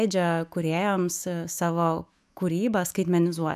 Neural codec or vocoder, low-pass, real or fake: autoencoder, 48 kHz, 128 numbers a frame, DAC-VAE, trained on Japanese speech; 14.4 kHz; fake